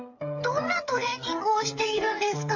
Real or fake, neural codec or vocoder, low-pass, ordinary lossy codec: fake; codec, 16 kHz, 8 kbps, FreqCodec, smaller model; 7.2 kHz; AAC, 48 kbps